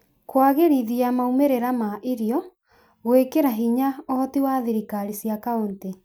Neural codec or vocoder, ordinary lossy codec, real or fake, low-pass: none; none; real; none